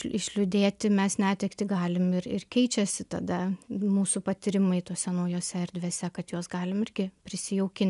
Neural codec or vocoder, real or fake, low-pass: none; real; 10.8 kHz